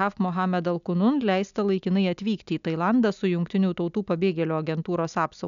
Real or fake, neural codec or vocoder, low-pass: real; none; 7.2 kHz